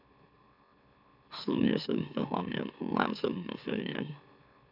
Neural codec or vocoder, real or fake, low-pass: autoencoder, 44.1 kHz, a latent of 192 numbers a frame, MeloTTS; fake; 5.4 kHz